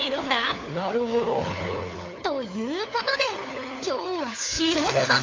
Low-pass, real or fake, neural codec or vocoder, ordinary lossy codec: 7.2 kHz; fake; codec, 16 kHz, 4 kbps, FunCodec, trained on LibriTTS, 50 frames a second; AAC, 32 kbps